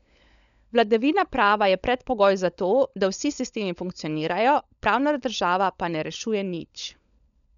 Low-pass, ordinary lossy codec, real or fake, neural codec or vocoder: 7.2 kHz; none; fake; codec, 16 kHz, 16 kbps, FunCodec, trained on LibriTTS, 50 frames a second